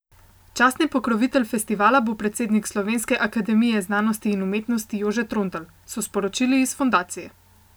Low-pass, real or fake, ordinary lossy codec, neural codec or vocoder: none; real; none; none